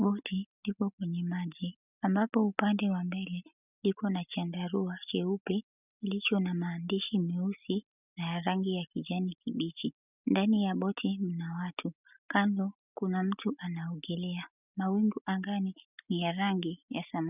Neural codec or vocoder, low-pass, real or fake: none; 3.6 kHz; real